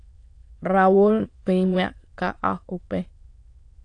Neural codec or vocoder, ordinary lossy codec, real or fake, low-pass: autoencoder, 22.05 kHz, a latent of 192 numbers a frame, VITS, trained on many speakers; AAC, 48 kbps; fake; 9.9 kHz